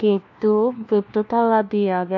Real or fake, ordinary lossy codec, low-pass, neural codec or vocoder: fake; none; 7.2 kHz; codec, 16 kHz, 1 kbps, FunCodec, trained on LibriTTS, 50 frames a second